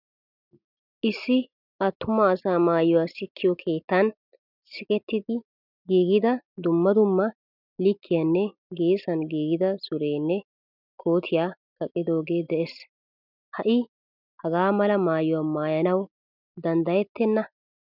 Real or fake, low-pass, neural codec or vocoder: real; 5.4 kHz; none